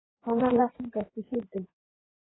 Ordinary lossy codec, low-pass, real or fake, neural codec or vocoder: AAC, 16 kbps; 7.2 kHz; fake; codec, 16 kHz in and 24 kHz out, 2.2 kbps, FireRedTTS-2 codec